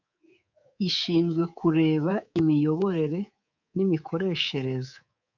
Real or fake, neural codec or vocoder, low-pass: fake; codec, 24 kHz, 3.1 kbps, DualCodec; 7.2 kHz